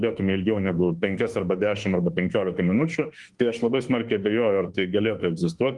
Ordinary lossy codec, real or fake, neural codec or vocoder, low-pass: Opus, 24 kbps; fake; autoencoder, 48 kHz, 32 numbers a frame, DAC-VAE, trained on Japanese speech; 10.8 kHz